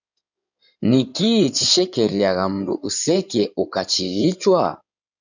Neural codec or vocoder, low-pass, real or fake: codec, 16 kHz in and 24 kHz out, 2.2 kbps, FireRedTTS-2 codec; 7.2 kHz; fake